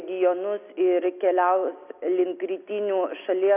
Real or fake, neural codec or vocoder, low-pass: real; none; 3.6 kHz